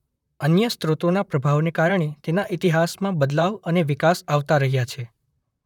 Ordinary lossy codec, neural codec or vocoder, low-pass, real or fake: none; vocoder, 44.1 kHz, 128 mel bands, Pupu-Vocoder; 19.8 kHz; fake